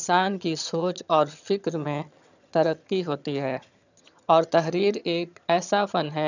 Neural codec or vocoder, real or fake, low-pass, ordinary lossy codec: vocoder, 22.05 kHz, 80 mel bands, HiFi-GAN; fake; 7.2 kHz; none